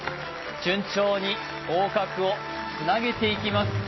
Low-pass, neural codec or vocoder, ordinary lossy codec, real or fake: 7.2 kHz; none; MP3, 24 kbps; real